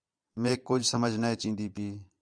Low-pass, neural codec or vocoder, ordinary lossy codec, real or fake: 9.9 kHz; vocoder, 22.05 kHz, 80 mel bands, Vocos; Opus, 64 kbps; fake